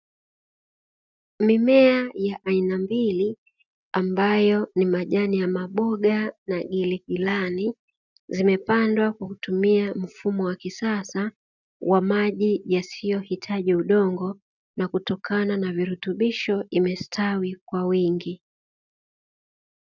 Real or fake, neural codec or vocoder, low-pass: real; none; 7.2 kHz